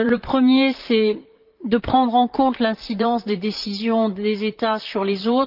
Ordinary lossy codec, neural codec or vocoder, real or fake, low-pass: Opus, 32 kbps; vocoder, 44.1 kHz, 128 mel bands, Pupu-Vocoder; fake; 5.4 kHz